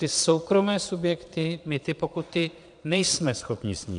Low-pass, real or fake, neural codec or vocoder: 9.9 kHz; fake; vocoder, 22.05 kHz, 80 mel bands, Vocos